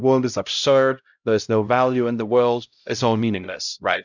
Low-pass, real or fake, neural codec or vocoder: 7.2 kHz; fake; codec, 16 kHz, 0.5 kbps, X-Codec, HuBERT features, trained on LibriSpeech